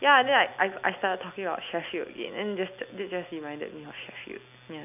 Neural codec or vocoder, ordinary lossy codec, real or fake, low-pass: none; none; real; 3.6 kHz